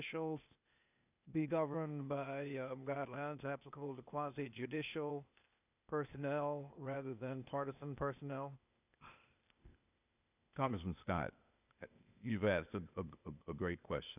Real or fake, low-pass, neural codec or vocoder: fake; 3.6 kHz; codec, 16 kHz, 0.8 kbps, ZipCodec